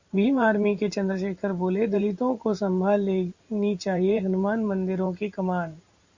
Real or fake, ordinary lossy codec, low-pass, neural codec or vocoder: fake; Opus, 64 kbps; 7.2 kHz; vocoder, 44.1 kHz, 128 mel bands every 512 samples, BigVGAN v2